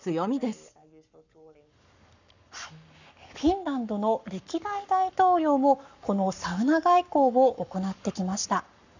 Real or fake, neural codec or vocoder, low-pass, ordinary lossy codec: fake; codec, 44.1 kHz, 7.8 kbps, Pupu-Codec; 7.2 kHz; none